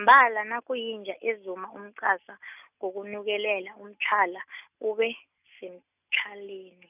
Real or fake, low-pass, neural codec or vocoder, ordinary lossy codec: real; 3.6 kHz; none; none